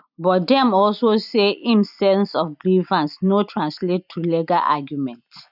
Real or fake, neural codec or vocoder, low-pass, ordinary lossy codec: real; none; 5.4 kHz; none